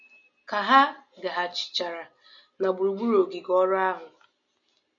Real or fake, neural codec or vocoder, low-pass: real; none; 7.2 kHz